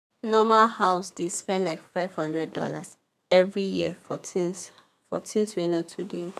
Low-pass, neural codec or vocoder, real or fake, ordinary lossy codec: 14.4 kHz; codec, 32 kHz, 1.9 kbps, SNAC; fake; none